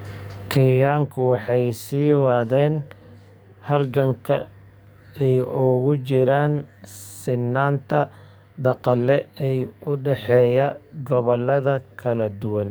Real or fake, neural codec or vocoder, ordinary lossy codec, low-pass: fake; codec, 44.1 kHz, 2.6 kbps, SNAC; none; none